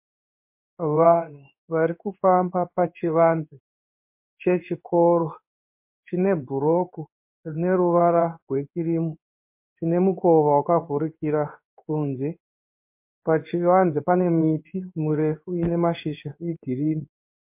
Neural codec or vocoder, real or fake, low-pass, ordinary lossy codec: codec, 16 kHz in and 24 kHz out, 1 kbps, XY-Tokenizer; fake; 3.6 kHz; MP3, 32 kbps